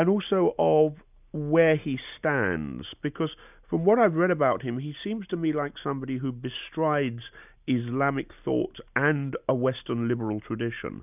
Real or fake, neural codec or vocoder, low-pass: real; none; 3.6 kHz